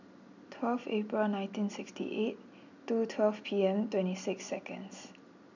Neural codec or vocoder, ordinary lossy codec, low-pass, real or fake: none; none; 7.2 kHz; real